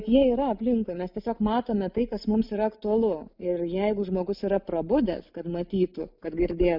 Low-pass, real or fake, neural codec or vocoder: 5.4 kHz; real; none